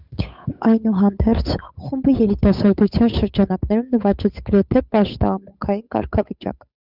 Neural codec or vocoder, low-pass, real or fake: codec, 16 kHz, 8 kbps, FunCodec, trained on Chinese and English, 25 frames a second; 5.4 kHz; fake